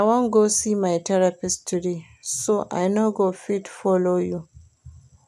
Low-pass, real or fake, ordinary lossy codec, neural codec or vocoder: 14.4 kHz; real; none; none